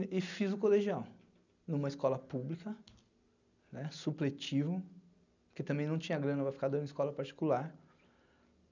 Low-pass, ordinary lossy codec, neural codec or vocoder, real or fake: 7.2 kHz; none; none; real